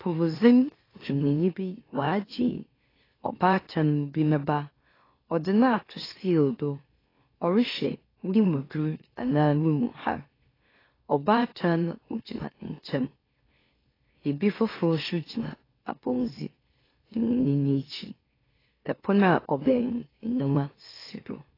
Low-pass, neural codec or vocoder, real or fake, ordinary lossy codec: 5.4 kHz; autoencoder, 44.1 kHz, a latent of 192 numbers a frame, MeloTTS; fake; AAC, 24 kbps